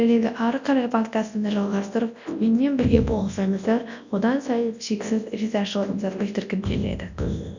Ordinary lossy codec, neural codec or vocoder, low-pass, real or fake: none; codec, 24 kHz, 0.9 kbps, WavTokenizer, large speech release; 7.2 kHz; fake